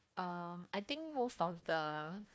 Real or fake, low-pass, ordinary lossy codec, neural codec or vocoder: fake; none; none; codec, 16 kHz, 1 kbps, FunCodec, trained on Chinese and English, 50 frames a second